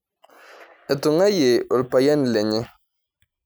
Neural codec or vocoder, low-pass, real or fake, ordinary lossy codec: none; none; real; none